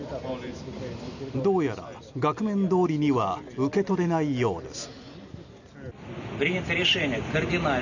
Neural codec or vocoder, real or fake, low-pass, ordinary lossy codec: none; real; 7.2 kHz; none